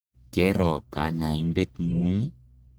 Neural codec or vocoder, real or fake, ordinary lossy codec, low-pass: codec, 44.1 kHz, 1.7 kbps, Pupu-Codec; fake; none; none